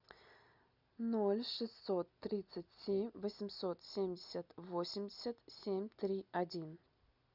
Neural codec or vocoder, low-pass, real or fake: none; 5.4 kHz; real